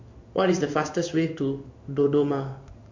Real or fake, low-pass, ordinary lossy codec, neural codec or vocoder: fake; 7.2 kHz; MP3, 48 kbps; codec, 16 kHz in and 24 kHz out, 1 kbps, XY-Tokenizer